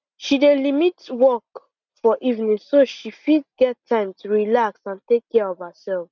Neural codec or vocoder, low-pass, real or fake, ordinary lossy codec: none; 7.2 kHz; real; none